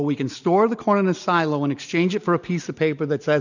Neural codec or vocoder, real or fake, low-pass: none; real; 7.2 kHz